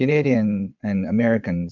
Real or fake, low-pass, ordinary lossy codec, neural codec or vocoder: fake; 7.2 kHz; MP3, 64 kbps; vocoder, 22.05 kHz, 80 mel bands, WaveNeXt